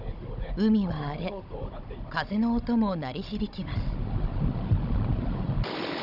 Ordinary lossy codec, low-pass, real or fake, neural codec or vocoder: none; 5.4 kHz; fake; codec, 16 kHz, 16 kbps, FunCodec, trained on Chinese and English, 50 frames a second